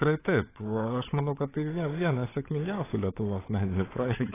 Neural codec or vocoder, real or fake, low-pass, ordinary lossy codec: codec, 16 kHz, 16 kbps, FunCodec, trained on LibriTTS, 50 frames a second; fake; 3.6 kHz; AAC, 16 kbps